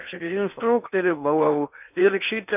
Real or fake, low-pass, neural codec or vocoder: fake; 3.6 kHz; codec, 16 kHz in and 24 kHz out, 0.6 kbps, FocalCodec, streaming, 4096 codes